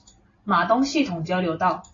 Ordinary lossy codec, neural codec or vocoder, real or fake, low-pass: AAC, 32 kbps; none; real; 7.2 kHz